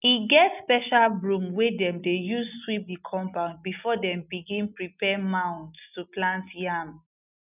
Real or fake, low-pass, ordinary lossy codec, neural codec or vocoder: real; 3.6 kHz; none; none